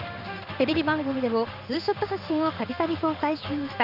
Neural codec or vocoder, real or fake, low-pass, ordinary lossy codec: codec, 16 kHz in and 24 kHz out, 1 kbps, XY-Tokenizer; fake; 5.4 kHz; none